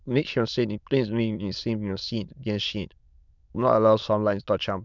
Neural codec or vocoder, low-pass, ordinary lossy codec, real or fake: autoencoder, 22.05 kHz, a latent of 192 numbers a frame, VITS, trained on many speakers; 7.2 kHz; none; fake